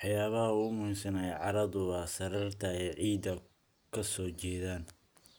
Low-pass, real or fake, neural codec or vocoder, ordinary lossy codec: none; real; none; none